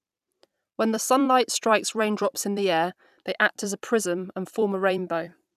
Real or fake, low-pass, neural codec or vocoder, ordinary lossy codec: fake; 14.4 kHz; vocoder, 44.1 kHz, 128 mel bands every 256 samples, BigVGAN v2; none